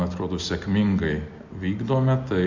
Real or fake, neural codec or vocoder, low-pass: real; none; 7.2 kHz